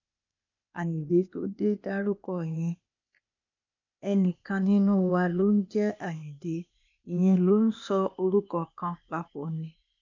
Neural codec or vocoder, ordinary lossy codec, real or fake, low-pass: codec, 16 kHz, 0.8 kbps, ZipCodec; none; fake; 7.2 kHz